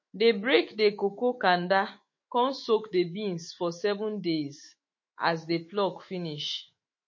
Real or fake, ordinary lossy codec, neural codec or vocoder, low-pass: fake; MP3, 32 kbps; autoencoder, 48 kHz, 128 numbers a frame, DAC-VAE, trained on Japanese speech; 7.2 kHz